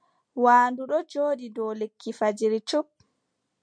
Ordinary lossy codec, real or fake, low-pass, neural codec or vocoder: MP3, 64 kbps; real; 9.9 kHz; none